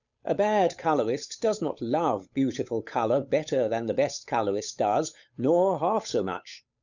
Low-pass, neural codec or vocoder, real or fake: 7.2 kHz; codec, 16 kHz, 8 kbps, FunCodec, trained on Chinese and English, 25 frames a second; fake